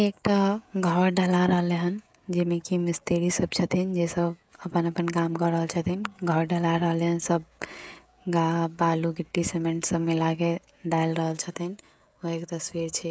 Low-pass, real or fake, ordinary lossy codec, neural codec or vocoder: none; fake; none; codec, 16 kHz, 16 kbps, FreqCodec, smaller model